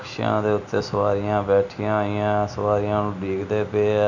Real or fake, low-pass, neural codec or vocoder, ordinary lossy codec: real; 7.2 kHz; none; none